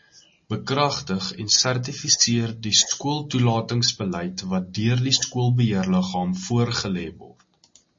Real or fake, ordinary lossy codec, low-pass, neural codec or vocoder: real; MP3, 32 kbps; 7.2 kHz; none